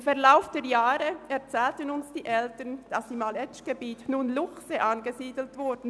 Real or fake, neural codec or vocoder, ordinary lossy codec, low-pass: real; none; none; none